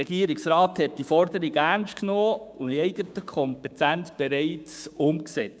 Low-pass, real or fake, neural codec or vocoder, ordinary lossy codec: none; fake; codec, 16 kHz, 6 kbps, DAC; none